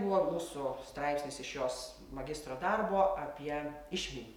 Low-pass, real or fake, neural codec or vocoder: 19.8 kHz; real; none